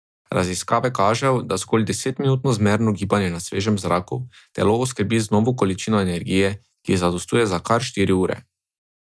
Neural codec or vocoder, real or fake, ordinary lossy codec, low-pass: none; real; none; none